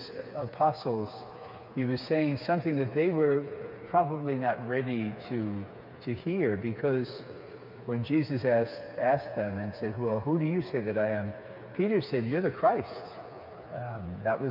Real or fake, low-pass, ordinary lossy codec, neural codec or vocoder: fake; 5.4 kHz; MP3, 48 kbps; codec, 16 kHz, 4 kbps, FreqCodec, smaller model